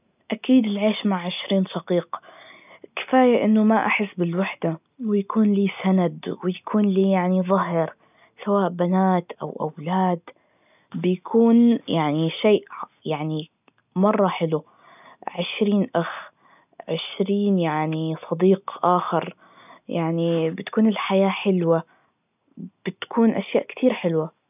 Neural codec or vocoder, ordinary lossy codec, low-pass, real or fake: none; none; 3.6 kHz; real